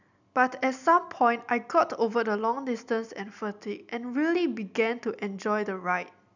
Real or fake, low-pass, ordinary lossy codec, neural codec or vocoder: real; 7.2 kHz; none; none